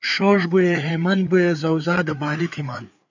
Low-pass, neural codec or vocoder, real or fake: 7.2 kHz; codec, 16 kHz, 4 kbps, FreqCodec, larger model; fake